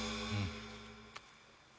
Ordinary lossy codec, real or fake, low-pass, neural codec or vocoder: none; real; none; none